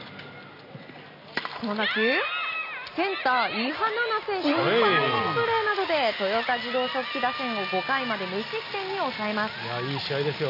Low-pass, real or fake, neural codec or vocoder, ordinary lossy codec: 5.4 kHz; real; none; none